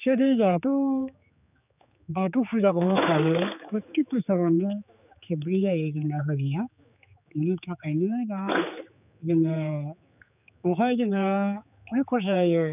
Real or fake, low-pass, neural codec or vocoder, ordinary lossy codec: fake; 3.6 kHz; codec, 16 kHz, 4 kbps, X-Codec, HuBERT features, trained on general audio; none